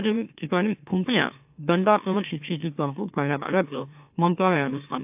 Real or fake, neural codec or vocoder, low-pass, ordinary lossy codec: fake; autoencoder, 44.1 kHz, a latent of 192 numbers a frame, MeloTTS; 3.6 kHz; AAC, 32 kbps